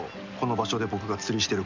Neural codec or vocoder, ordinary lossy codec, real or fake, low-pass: none; none; real; 7.2 kHz